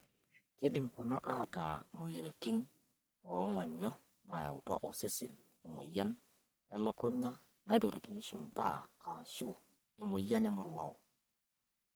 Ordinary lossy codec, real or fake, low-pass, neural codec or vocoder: none; fake; none; codec, 44.1 kHz, 1.7 kbps, Pupu-Codec